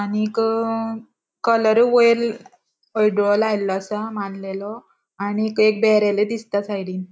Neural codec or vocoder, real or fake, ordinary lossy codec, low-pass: none; real; none; none